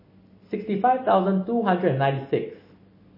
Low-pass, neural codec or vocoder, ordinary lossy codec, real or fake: 5.4 kHz; none; MP3, 24 kbps; real